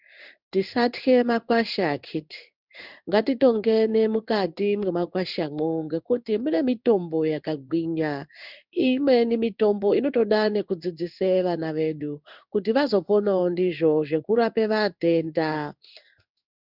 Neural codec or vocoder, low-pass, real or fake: codec, 16 kHz in and 24 kHz out, 1 kbps, XY-Tokenizer; 5.4 kHz; fake